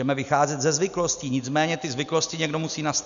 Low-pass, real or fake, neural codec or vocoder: 7.2 kHz; real; none